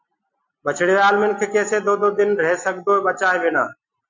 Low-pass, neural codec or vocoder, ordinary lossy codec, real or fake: 7.2 kHz; none; MP3, 64 kbps; real